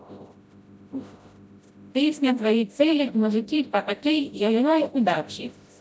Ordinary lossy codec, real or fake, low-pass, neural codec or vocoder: none; fake; none; codec, 16 kHz, 0.5 kbps, FreqCodec, smaller model